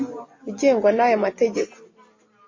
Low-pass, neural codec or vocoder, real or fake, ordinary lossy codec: 7.2 kHz; none; real; MP3, 32 kbps